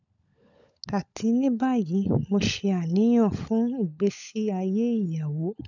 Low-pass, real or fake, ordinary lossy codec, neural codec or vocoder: 7.2 kHz; fake; none; codec, 16 kHz, 16 kbps, FunCodec, trained on LibriTTS, 50 frames a second